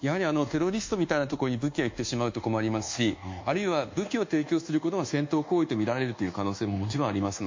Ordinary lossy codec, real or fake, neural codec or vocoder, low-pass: MP3, 48 kbps; fake; codec, 24 kHz, 1.2 kbps, DualCodec; 7.2 kHz